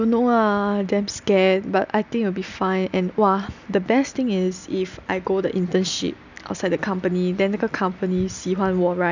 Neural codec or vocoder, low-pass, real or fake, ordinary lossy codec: none; 7.2 kHz; real; none